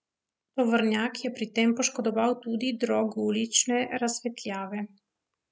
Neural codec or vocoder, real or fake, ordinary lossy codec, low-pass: none; real; none; none